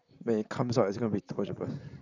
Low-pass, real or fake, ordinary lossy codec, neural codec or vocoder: 7.2 kHz; fake; none; vocoder, 44.1 kHz, 128 mel bands, Pupu-Vocoder